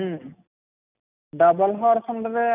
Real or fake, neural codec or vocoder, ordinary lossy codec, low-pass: real; none; none; 3.6 kHz